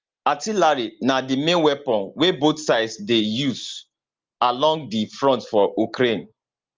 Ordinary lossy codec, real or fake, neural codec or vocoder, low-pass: Opus, 32 kbps; real; none; 7.2 kHz